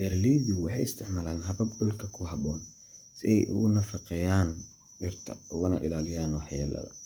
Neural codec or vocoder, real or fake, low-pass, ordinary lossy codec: codec, 44.1 kHz, 7.8 kbps, Pupu-Codec; fake; none; none